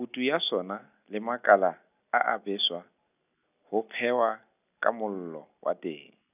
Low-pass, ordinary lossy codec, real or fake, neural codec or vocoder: 3.6 kHz; none; real; none